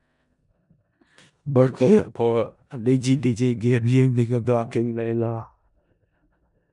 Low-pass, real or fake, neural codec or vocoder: 10.8 kHz; fake; codec, 16 kHz in and 24 kHz out, 0.4 kbps, LongCat-Audio-Codec, four codebook decoder